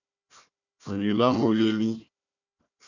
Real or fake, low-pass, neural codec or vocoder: fake; 7.2 kHz; codec, 16 kHz, 1 kbps, FunCodec, trained on Chinese and English, 50 frames a second